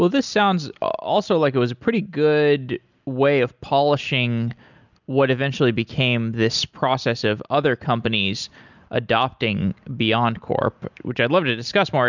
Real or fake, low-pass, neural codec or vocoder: real; 7.2 kHz; none